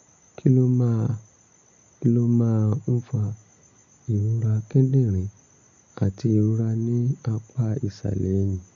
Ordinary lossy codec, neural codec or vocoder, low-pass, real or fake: none; none; 7.2 kHz; real